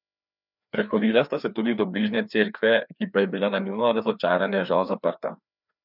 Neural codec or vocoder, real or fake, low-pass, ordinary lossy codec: codec, 16 kHz, 2 kbps, FreqCodec, larger model; fake; 5.4 kHz; none